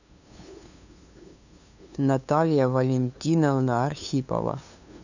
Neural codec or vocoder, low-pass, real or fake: codec, 16 kHz, 2 kbps, FunCodec, trained on LibriTTS, 25 frames a second; 7.2 kHz; fake